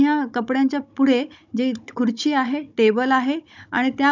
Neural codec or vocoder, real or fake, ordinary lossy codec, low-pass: codec, 16 kHz, 16 kbps, FunCodec, trained on Chinese and English, 50 frames a second; fake; none; 7.2 kHz